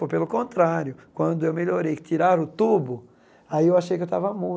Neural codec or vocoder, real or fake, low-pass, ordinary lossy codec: none; real; none; none